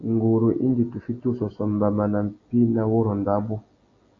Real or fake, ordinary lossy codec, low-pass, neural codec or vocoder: real; AAC, 32 kbps; 7.2 kHz; none